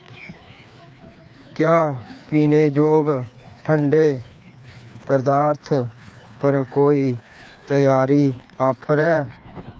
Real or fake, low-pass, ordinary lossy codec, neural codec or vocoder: fake; none; none; codec, 16 kHz, 2 kbps, FreqCodec, larger model